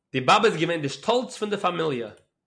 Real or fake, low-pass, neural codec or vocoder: real; 9.9 kHz; none